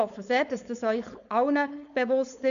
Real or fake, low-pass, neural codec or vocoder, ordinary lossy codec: fake; 7.2 kHz; codec, 16 kHz, 4.8 kbps, FACodec; none